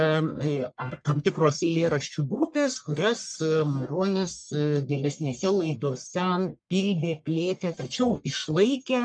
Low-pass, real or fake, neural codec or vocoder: 9.9 kHz; fake; codec, 44.1 kHz, 1.7 kbps, Pupu-Codec